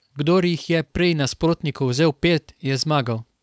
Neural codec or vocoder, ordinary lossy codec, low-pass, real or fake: codec, 16 kHz, 4.8 kbps, FACodec; none; none; fake